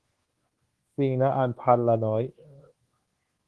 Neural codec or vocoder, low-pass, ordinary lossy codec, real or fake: codec, 24 kHz, 1.2 kbps, DualCodec; 10.8 kHz; Opus, 16 kbps; fake